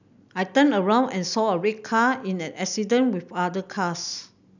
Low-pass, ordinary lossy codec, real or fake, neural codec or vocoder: 7.2 kHz; none; real; none